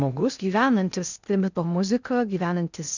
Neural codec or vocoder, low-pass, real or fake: codec, 16 kHz in and 24 kHz out, 0.6 kbps, FocalCodec, streaming, 2048 codes; 7.2 kHz; fake